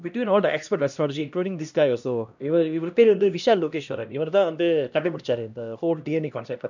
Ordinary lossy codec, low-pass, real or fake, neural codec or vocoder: none; 7.2 kHz; fake; codec, 16 kHz, 1 kbps, X-Codec, HuBERT features, trained on LibriSpeech